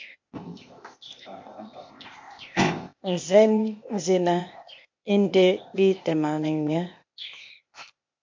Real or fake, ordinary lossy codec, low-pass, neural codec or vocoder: fake; MP3, 48 kbps; 7.2 kHz; codec, 16 kHz, 0.8 kbps, ZipCodec